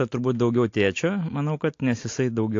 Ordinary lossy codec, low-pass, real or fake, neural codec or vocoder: AAC, 48 kbps; 7.2 kHz; real; none